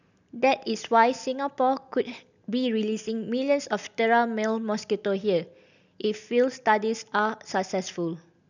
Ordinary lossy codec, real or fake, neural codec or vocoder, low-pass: none; real; none; 7.2 kHz